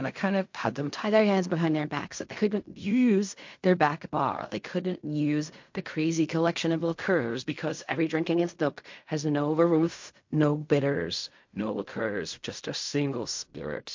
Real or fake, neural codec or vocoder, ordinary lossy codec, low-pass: fake; codec, 16 kHz in and 24 kHz out, 0.4 kbps, LongCat-Audio-Codec, fine tuned four codebook decoder; MP3, 48 kbps; 7.2 kHz